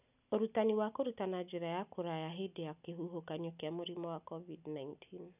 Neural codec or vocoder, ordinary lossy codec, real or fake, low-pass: none; none; real; 3.6 kHz